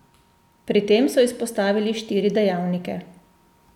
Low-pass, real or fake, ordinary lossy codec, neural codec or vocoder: 19.8 kHz; real; none; none